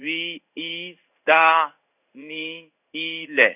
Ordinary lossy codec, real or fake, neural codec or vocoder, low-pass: none; fake; codec, 16 kHz in and 24 kHz out, 1 kbps, XY-Tokenizer; 3.6 kHz